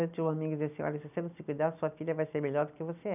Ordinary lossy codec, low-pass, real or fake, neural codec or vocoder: none; 3.6 kHz; real; none